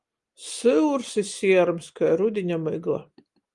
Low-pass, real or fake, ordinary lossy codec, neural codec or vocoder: 10.8 kHz; real; Opus, 32 kbps; none